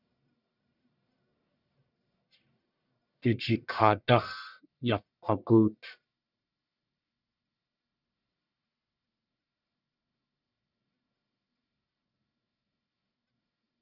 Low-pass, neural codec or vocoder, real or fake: 5.4 kHz; codec, 44.1 kHz, 1.7 kbps, Pupu-Codec; fake